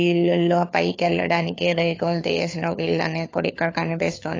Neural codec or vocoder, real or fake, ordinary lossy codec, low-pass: codec, 16 kHz, 4 kbps, FunCodec, trained on LibriTTS, 50 frames a second; fake; AAC, 32 kbps; 7.2 kHz